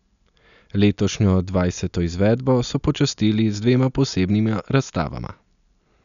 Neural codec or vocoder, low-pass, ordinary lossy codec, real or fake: none; 7.2 kHz; none; real